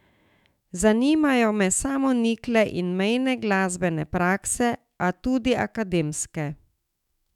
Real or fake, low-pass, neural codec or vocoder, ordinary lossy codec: fake; 19.8 kHz; autoencoder, 48 kHz, 128 numbers a frame, DAC-VAE, trained on Japanese speech; none